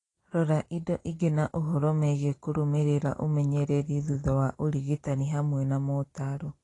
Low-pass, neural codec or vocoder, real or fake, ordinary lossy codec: 10.8 kHz; none; real; AAC, 32 kbps